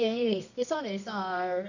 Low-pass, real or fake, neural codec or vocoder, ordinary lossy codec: 7.2 kHz; fake; codec, 24 kHz, 0.9 kbps, WavTokenizer, medium music audio release; none